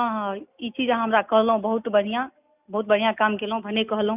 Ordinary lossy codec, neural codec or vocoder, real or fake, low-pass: none; none; real; 3.6 kHz